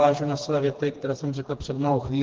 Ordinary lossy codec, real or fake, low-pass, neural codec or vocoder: Opus, 24 kbps; fake; 7.2 kHz; codec, 16 kHz, 2 kbps, FreqCodec, smaller model